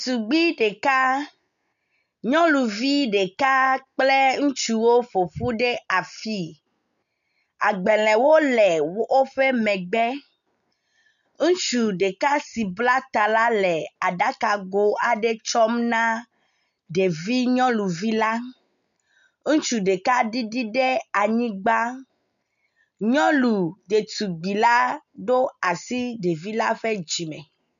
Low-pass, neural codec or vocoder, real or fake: 7.2 kHz; none; real